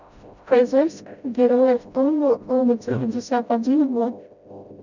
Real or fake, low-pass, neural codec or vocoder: fake; 7.2 kHz; codec, 16 kHz, 0.5 kbps, FreqCodec, smaller model